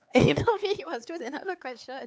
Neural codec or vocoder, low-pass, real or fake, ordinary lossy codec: codec, 16 kHz, 4 kbps, X-Codec, HuBERT features, trained on LibriSpeech; none; fake; none